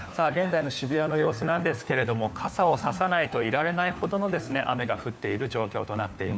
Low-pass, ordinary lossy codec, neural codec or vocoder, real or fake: none; none; codec, 16 kHz, 4 kbps, FunCodec, trained on LibriTTS, 50 frames a second; fake